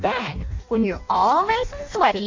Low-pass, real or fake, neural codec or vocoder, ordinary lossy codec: 7.2 kHz; fake; codec, 16 kHz in and 24 kHz out, 0.6 kbps, FireRedTTS-2 codec; MP3, 48 kbps